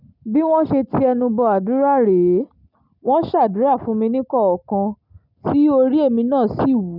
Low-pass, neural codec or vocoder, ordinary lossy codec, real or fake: 5.4 kHz; none; none; real